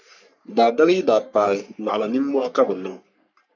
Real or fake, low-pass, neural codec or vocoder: fake; 7.2 kHz; codec, 44.1 kHz, 3.4 kbps, Pupu-Codec